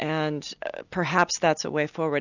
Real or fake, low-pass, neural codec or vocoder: real; 7.2 kHz; none